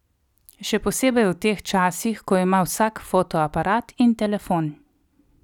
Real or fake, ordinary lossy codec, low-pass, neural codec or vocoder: real; none; 19.8 kHz; none